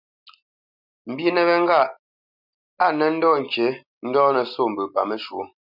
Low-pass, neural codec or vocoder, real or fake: 5.4 kHz; none; real